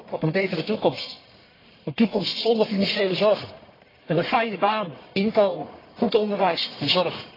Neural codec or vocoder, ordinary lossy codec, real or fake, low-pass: codec, 44.1 kHz, 1.7 kbps, Pupu-Codec; AAC, 24 kbps; fake; 5.4 kHz